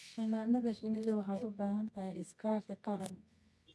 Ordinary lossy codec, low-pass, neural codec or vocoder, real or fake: none; none; codec, 24 kHz, 0.9 kbps, WavTokenizer, medium music audio release; fake